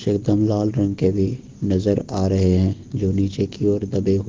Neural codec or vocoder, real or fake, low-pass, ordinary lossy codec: none; real; 7.2 kHz; Opus, 16 kbps